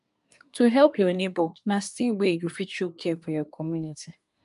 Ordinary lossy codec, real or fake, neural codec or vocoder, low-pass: none; fake; codec, 24 kHz, 1 kbps, SNAC; 10.8 kHz